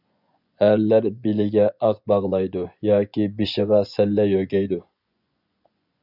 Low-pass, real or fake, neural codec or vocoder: 5.4 kHz; fake; vocoder, 24 kHz, 100 mel bands, Vocos